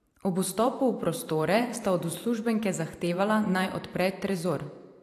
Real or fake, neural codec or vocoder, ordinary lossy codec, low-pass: fake; vocoder, 44.1 kHz, 128 mel bands every 512 samples, BigVGAN v2; AAC, 64 kbps; 14.4 kHz